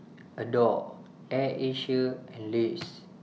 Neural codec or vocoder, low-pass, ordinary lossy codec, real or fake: none; none; none; real